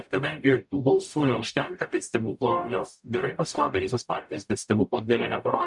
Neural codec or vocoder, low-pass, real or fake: codec, 44.1 kHz, 0.9 kbps, DAC; 10.8 kHz; fake